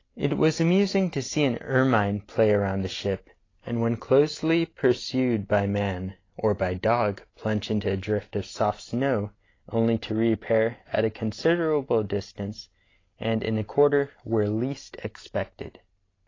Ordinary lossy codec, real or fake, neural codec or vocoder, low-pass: AAC, 32 kbps; real; none; 7.2 kHz